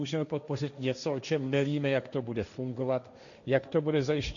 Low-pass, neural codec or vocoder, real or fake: 7.2 kHz; codec, 16 kHz, 1.1 kbps, Voila-Tokenizer; fake